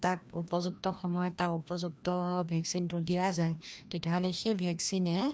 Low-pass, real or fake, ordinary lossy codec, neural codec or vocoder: none; fake; none; codec, 16 kHz, 1 kbps, FreqCodec, larger model